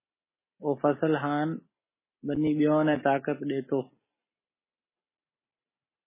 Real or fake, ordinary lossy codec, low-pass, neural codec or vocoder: real; MP3, 16 kbps; 3.6 kHz; none